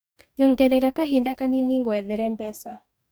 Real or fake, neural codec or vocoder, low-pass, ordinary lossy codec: fake; codec, 44.1 kHz, 2.6 kbps, DAC; none; none